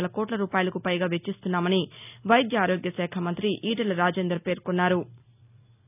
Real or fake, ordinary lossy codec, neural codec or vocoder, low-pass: real; none; none; 3.6 kHz